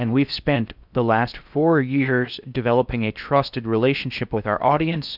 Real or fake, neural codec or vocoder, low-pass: fake; codec, 16 kHz in and 24 kHz out, 0.6 kbps, FocalCodec, streaming, 4096 codes; 5.4 kHz